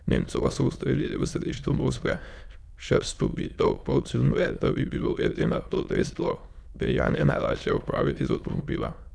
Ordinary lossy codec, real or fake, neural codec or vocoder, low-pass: none; fake; autoencoder, 22.05 kHz, a latent of 192 numbers a frame, VITS, trained on many speakers; none